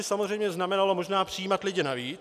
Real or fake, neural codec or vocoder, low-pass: real; none; 14.4 kHz